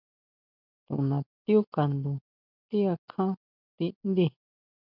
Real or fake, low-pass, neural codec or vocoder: real; 5.4 kHz; none